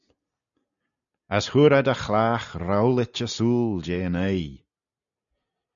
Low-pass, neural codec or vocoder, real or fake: 7.2 kHz; none; real